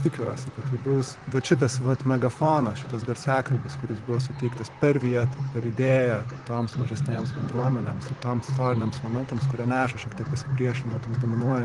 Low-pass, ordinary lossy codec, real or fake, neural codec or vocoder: 10.8 kHz; Opus, 24 kbps; fake; vocoder, 44.1 kHz, 128 mel bands, Pupu-Vocoder